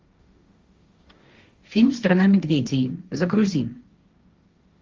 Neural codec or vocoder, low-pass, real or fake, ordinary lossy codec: codec, 16 kHz, 1.1 kbps, Voila-Tokenizer; 7.2 kHz; fake; Opus, 32 kbps